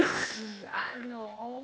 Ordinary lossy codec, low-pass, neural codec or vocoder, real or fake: none; none; codec, 16 kHz, 0.8 kbps, ZipCodec; fake